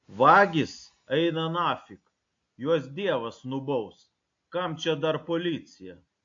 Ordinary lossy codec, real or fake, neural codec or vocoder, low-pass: AAC, 48 kbps; real; none; 7.2 kHz